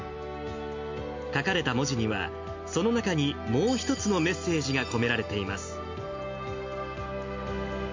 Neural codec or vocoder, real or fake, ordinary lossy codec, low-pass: none; real; MP3, 48 kbps; 7.2 kHz